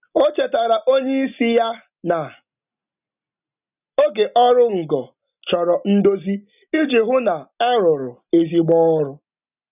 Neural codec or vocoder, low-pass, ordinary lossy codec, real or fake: none; 3.6 kHz; none; real